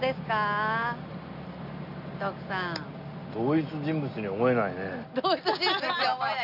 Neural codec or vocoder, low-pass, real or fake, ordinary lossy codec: none; 5.4 kHz; real; none